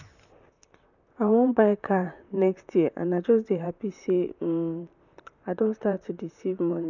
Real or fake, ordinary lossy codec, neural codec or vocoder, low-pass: fake; none; vocoder, 44.1 kHz, 128 mel bands, Pupu-Vocoder; 7.2 kHz